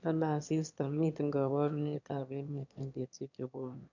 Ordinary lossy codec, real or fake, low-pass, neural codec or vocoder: none; fake; 7.2 kHz; autoencoder, 22.05 kHz, a latent of 192 numbers a frame, VITS, trained on one speaker